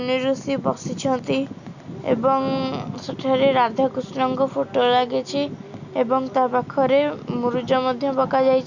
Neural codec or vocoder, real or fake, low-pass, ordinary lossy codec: none; real; 7.2 kHz; none